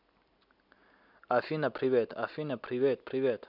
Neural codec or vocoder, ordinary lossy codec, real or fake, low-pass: none; none; real; 5.4 kHz